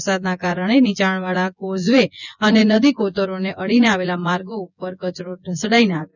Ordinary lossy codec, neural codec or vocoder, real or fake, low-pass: none; vocoder, 24 kHz, 100 mel bands, Vocos; fake; 7.2 kHz